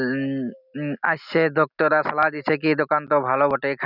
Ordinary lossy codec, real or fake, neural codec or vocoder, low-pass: none; real; none; 5.4 kHz